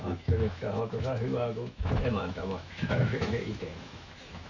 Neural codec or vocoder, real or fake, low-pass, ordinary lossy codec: autoencoder, 48 kHz, 128 numbers a frame, DAC-VAE, trained on Japanese speech; fake; 7.2 kHz; none